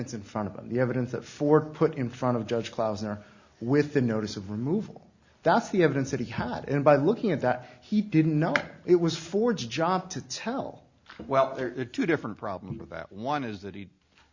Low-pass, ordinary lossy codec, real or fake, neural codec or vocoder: 7.2 kHz; Opus, 64 kbps; real; none